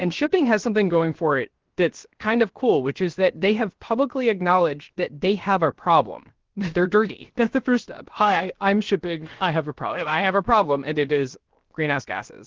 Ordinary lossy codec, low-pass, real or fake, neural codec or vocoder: Opus, 16 kbps; 7.2 kHz; fake; codec, 16 kHz, 0.7 kbps, FocalCodec